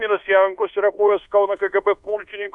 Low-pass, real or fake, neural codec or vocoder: 10.8 kHz; fake; codec, 24 kHz, 1.2 kbps, DualCodec